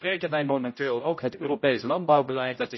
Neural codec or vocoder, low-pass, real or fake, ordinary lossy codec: codec, 16 kHz, 0.5 kbps, X-Codec, HuBERT features, trained on general audio; 7.2 kHz; fake; MP3, 24 kbps